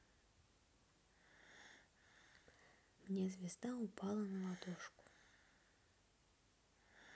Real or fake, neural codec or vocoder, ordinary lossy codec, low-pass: real; none; none; none